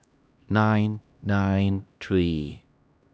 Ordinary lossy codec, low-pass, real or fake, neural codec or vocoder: none; none; fake; codec, 16 kHz, 1 kbps, X-Codec, HuBERT features, trained on LibriSpeech